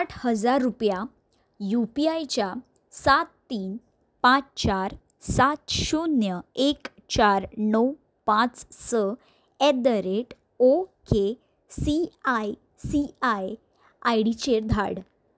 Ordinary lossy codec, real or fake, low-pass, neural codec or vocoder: none; real; none; none